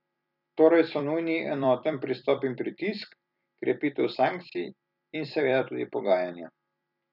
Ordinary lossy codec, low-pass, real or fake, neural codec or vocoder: none; 5.4 kHz; fake; vocoder, 44.1 kHz, 128 mel bands every 256 samples, BigVGAN v2